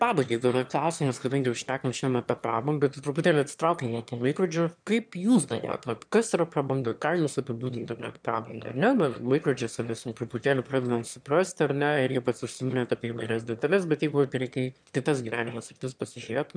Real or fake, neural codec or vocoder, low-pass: fake; autoencoder, 22.05 kHz, a latent of 192 numbers a frame, VITS, trained on one speaker; 9.9 kHz